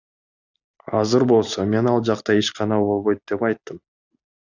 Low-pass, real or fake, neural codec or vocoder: 7.2 kHz; real; none